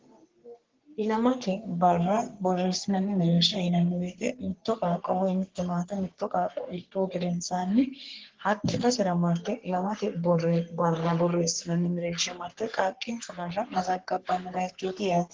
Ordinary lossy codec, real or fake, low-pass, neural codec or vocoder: Opus, 16 kbps; fake; 7.2 kHz; codec, 44.1 kHz, 3.4 kbps, Pupu-Codec